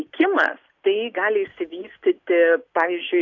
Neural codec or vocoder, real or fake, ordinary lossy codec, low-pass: none; real; AAC, 48 kbps; 7.2 kHz